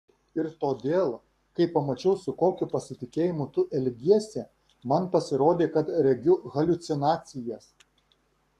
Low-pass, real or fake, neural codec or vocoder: 14.4 kHz; fake; codec, 44.1 kHz, 7.8 kbps, Pupu-Codec